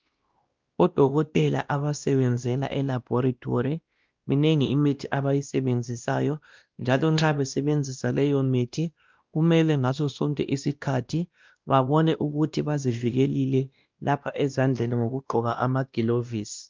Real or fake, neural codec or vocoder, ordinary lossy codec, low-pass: fake; codec, 16 kHz, 1 kbps, X-Codec, WavLM features, trained on Multilingual LibriSpeech; Opus, 24 kbps; 7.2 kHz